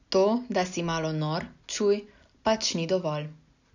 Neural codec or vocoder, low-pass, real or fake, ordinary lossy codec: none; 7.2 kHz; real; none